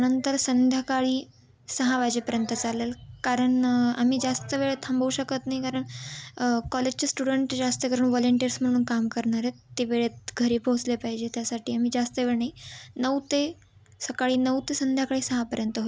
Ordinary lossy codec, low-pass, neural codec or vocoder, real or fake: none; none; none; real